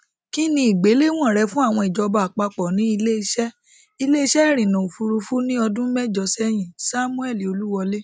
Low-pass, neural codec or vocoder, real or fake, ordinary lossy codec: none; none; real; none